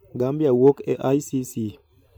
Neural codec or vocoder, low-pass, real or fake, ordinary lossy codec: vocoder, 44.1 kHz, 128 mel bands every 512 samples, BigVGAN v2; none; fake; none